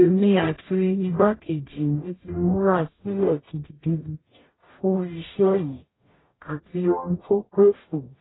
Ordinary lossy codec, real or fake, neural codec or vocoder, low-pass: AAC, 16 kbps; fake; codec, 44.1 kHz, 0.9 kbps, DAC; 7.2 kHz